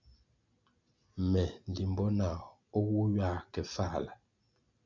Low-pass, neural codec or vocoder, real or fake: 7.2 kHz; none; real